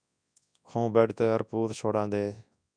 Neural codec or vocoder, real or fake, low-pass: codec, 24 kHz, 0.9 kbps, WavTokenizer, large speech release; fake; 9.9 kHz